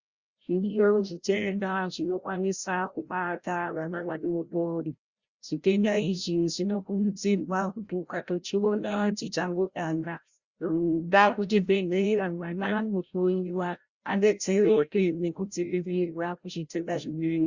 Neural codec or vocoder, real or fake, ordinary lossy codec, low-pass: codec, 16 kHz, 0.5 kbps, FreqCodec, larger model; fake; Opus, 64 kbps; 7.2 kHz